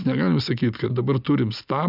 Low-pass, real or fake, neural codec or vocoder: 5.4 kHz; real; none